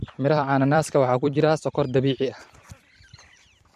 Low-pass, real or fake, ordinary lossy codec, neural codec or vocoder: 14.4 kHz; fake; MP3, 64 kbps; vocoder, 44.1 kHz, 128 mel bands every 256 samples, BigVGAN v2